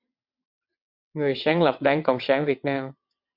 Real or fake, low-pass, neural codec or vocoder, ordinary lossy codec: fake; 5.4 kHz; vocoder, 22.05 kHz, 80 mel bands, WaveNeXt; MP3, 48 kbps